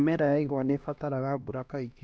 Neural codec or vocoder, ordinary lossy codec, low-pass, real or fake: codec, 16 kHz, 1 kbps, X-Codec, HuBERT features, trained on LibriSpeech; none; none; fake